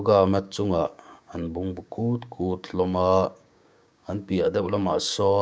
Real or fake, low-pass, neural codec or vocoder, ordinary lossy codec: fake; none; codec, 16 kHz, 6 kbps, DAC; none